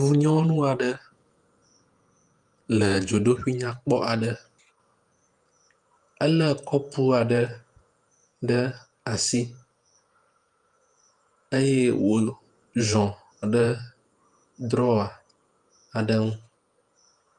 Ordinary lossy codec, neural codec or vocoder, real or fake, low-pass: Opus, 32 kbps; vocoder, 48 kHz, 128 mel bands, Vocos; fake; 10.8 kHz